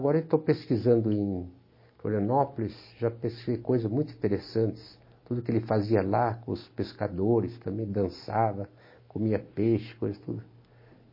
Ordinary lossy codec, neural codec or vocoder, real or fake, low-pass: MP3, 24 kbps; none; real; 5.4 kHz